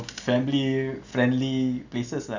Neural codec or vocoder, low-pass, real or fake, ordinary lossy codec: none; 7.2 kHz; real; none